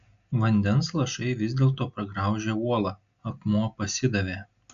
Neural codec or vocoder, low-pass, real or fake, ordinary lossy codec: none; 7.2 kHz; real; AAC, 64 kbps